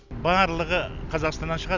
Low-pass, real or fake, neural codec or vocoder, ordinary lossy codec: 7.2 kHz; real; none; none